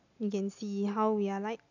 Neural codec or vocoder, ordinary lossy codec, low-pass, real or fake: none; none; 7.2 kHz; real